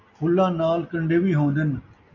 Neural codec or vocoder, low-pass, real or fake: none; 7.2 kHz; real